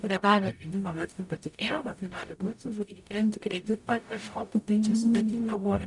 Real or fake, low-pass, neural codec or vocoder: fake; 10.8 kHz; codec, 44.1 kHz, 0.9 kbps, DAC